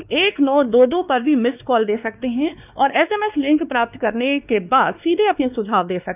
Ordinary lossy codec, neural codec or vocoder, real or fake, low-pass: none; codec, 16 kHz, 2 kbps, X-Codec, WavLM features, trained on Multilingual LibriSpeech; fake; 3.6 kHz